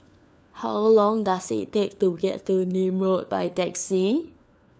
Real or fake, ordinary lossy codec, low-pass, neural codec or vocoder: fake; none; none; codec, 16 kHz, 4 kbps, FunCodec, trained on LibriTTS, 50 frames a second